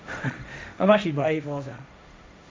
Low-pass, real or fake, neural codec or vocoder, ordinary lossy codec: none; fake; codec, 16 kHz, 1.1 kbps, Voila-Tokenizer; none